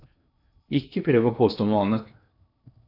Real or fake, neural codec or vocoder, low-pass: fake; codec, 16 kHz in and 24 kHz out, 0.8 kbps, FocalCodec, streaming, 65536 codes; 5.4 kHz